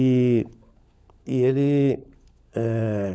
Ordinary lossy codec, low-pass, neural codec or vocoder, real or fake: none; none; codec, 16 kHz, 16 kbps, FunCodec, trained on LibriTTS, 50 frames a second; fake